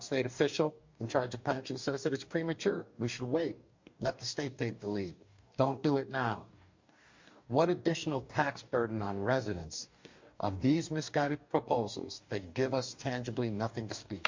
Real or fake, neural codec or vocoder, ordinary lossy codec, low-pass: fake; codec, 44.1 kHz, 2.6 kbps, DAC; MP3, 48 kbps; 7.2 kHz